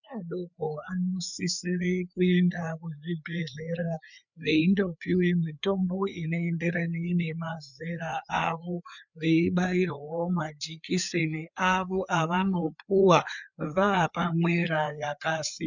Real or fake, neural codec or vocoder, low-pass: fake; codec, 16 kHz, 4 kbps, FreqCodec, larger model; 7.2 kHz